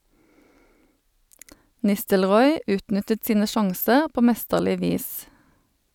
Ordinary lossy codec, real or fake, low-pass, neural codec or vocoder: none; real; none; none